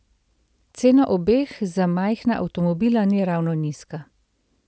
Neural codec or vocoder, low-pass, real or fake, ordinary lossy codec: none; none; real; none